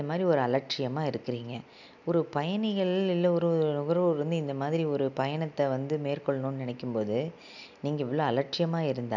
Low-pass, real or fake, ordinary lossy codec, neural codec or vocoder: 7.2 kHz; real; none; none